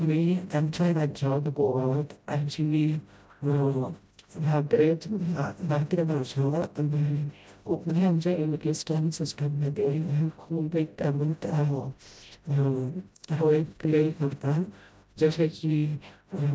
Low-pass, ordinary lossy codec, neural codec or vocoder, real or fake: none; none; codec, 16 kHz, 0.5 kbps, FreqCodec, smaller model; fake